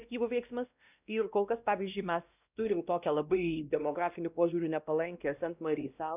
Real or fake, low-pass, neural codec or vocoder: fake; 3.6 kHz; codec, 16 kHz, 1 kbps, X-Codec, WavLM features, trained on Multilingual LibriSpeech